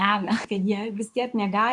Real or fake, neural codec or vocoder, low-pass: fake; codec, 24 kHz, 0.9 kbps, WavTokenizer, medium speech release version 2; 10.8 kHz